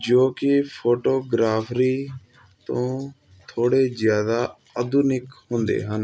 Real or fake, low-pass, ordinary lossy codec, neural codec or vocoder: real; none; none; none